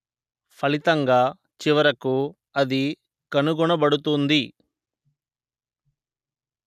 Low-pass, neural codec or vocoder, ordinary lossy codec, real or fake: 14.4 kHz; none; none; real